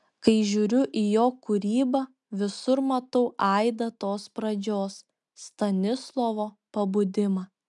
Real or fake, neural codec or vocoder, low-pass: real; none; 10.8 kHz